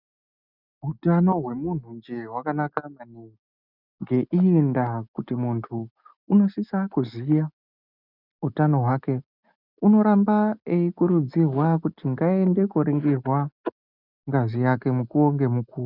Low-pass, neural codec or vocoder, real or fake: 5.4 kHz; none; real